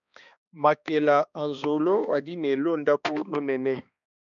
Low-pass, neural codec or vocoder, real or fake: 7.2 kHz; codec, 16 kHz, 2 kbps, X-Codec, HuBERT features, trained on balanced general audio; fake